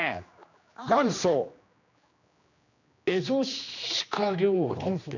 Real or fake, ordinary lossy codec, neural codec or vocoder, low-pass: fake; none; codec, 16 kHz, 2 kbps, X-Codec, HuBERT features, trained on general audio; 7.2 kHz